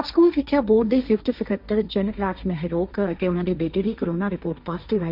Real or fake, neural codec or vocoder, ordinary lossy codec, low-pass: fake; codec, 16 kHz, 1.1 kbps, Voila-Tokenizer; none; 5.4 kHz